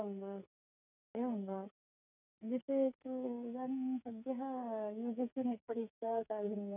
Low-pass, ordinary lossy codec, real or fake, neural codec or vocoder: 3.6 kHz; none; fake; codec, 44.1 kHz, 2.6 kbps, SNAC